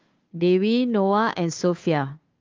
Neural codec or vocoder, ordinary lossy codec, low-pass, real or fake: codec, 16 kHz, 2 kbps, FunCodec, trained on Chinese and English, 25 frames a second; Opus, 32 kbps; 7.2 kHz; fake